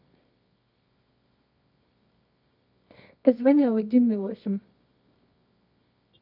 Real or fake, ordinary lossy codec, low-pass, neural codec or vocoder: fake; none; 5.4 kHz; codec, 24 kHz, 0.9 kbps, WavTokenizer, medium music audio release